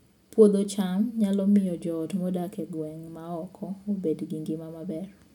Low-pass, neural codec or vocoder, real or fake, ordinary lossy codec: 19.8 kHz; none; real; none